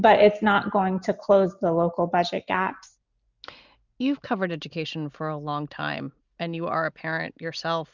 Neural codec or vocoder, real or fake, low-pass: none; real; 7.2 kHz